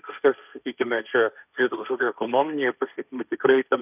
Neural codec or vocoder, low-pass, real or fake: codec, 16 kHz, 1.1 kbps, Voila-Tokenizer; 3.6 kHz; fake